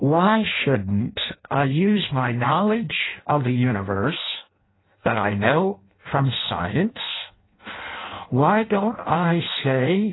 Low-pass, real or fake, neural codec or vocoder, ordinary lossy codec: 7.2 kHz; fake; codec, 16 kHz in and 24 kHz out, 0.6 kbps, FireRedTTS-2 codec; AAC, 16 kbps